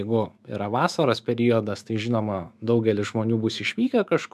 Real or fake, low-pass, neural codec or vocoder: fake; 14.4 kHz; autoencoder, 48 kHz, 128 numbers a frame, DAC-VAE, trained on Japanese speech